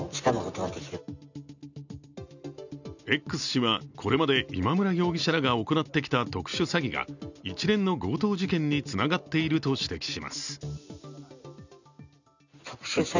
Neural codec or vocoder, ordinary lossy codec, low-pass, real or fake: none; none; 7.2 kHz; real